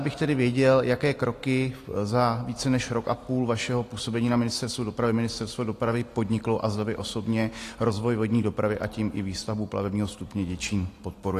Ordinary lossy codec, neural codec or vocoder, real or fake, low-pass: AAC, 48 kbps; none; real; 14.4 kHz